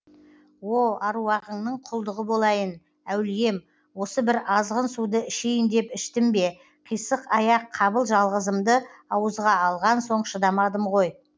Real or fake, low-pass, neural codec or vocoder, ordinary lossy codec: real; none; none; none